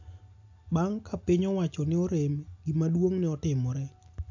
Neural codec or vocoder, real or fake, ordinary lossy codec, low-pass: none; real; AAC, 48 kbps; 7.2 kHz